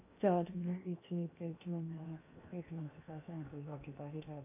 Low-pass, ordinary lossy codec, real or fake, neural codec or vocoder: 3.6 kHz; none; fake; codec, 16 kHz in and 24 kHz out, 0.8 kbps, FocalCodec, streaming, 65536 codes